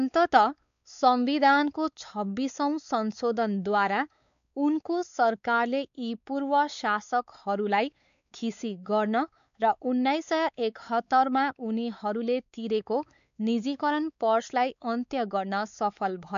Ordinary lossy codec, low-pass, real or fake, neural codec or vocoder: none; 7.2 kHz; fake; codec, 16 kHz, 4 kbps, X-Codec, WavLM features, trained on Multilingual LibriSpeech